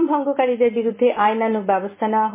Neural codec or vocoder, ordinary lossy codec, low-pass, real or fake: none; MP3, 16 kbps; 3.6 kHz; real